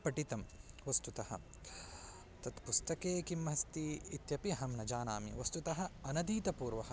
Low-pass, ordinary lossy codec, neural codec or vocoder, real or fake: none; none; none; real